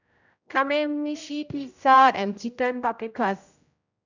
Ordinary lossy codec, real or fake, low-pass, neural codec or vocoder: none; fake; 7.2 kHz; codec, 16 kHz, 0.5 kbps, X-Codec, HuBERT features, trained on general audio